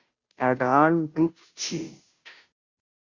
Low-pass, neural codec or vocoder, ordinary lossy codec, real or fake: 7.2 kHz; codec, 16 kHz, 0.5 kbps, FunCodec, trained on Chinese and English, 25 frames a second; Opus, 64 kbps; fake